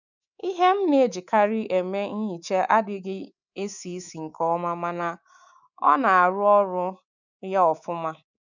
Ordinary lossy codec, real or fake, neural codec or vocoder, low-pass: none; fake; codec, 24 kHz, 3.1 kbps, DualCodec; 7.2 kHz